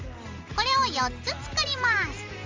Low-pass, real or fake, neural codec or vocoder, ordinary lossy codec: 7.2 kHz; real; none; Opus, 32 kbps